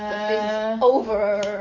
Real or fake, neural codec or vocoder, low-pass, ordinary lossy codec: fake; codec, 44.1 kHz, 7.8 kbps, DAC; 7.2 kHz; MP3, 48 kbps